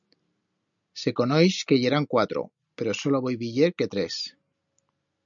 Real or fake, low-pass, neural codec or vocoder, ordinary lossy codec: real; 7.2 kHz; none; MP3, 96 kbps